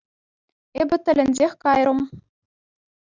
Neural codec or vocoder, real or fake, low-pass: none; real; 7.2 kHz